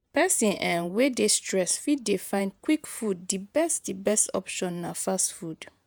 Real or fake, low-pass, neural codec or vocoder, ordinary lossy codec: fake; none; vocoder, 48 kHz, 128 mel bands, Vocos; none